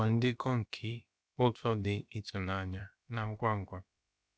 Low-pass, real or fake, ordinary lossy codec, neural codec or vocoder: none; fake; none; codec, 16 kHz, about 1 kbps, DyCAST, with the encoder's durations